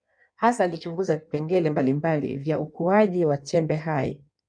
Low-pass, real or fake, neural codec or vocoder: 9.9 kHz; fake; codec, 16 kHz in and 24 kHz out, 1.1 kbps, FireRedTTS-2 codec